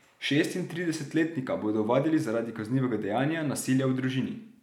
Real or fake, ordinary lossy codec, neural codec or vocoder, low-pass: real; none; none; 19.8 kHz